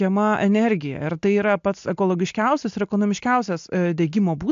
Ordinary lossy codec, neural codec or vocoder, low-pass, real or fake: AAC, 64 kbps; none; 7.2 kHz; real